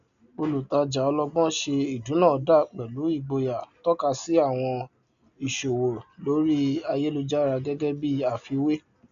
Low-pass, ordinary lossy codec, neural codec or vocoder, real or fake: 7.2 kHz; none; none; real